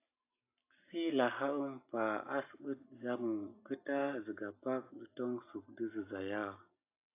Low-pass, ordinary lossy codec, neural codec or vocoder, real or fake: 3.6 kHz; AAC, 24 kbps; none; real